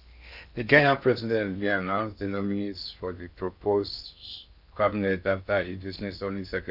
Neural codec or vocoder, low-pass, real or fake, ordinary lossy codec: codec, 16 kHz in and 24 kHz out, 0.6 kbps, FocalCodec, streaming, 2048 codes; 5.4 kHz; fake; none